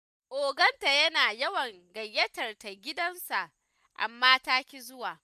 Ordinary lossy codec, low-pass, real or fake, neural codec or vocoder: none; 14.4 kHz; real; none